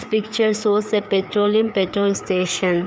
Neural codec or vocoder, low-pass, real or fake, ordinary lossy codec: codec, 16 kHz, 4 kbps, FreqCodec, larger model; none; fake; none